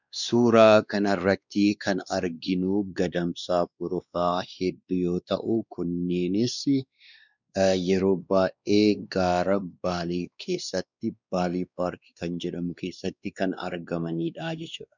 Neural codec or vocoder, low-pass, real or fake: codec, 16 kHz, 2 kbps, X-Codec, WavLM features, trained on Multilingual LibriSpeech; 7.2 kHz; fake